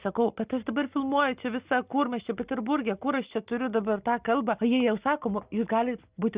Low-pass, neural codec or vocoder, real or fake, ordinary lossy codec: 3.6 kHz; none; real; Opus, 64 kbps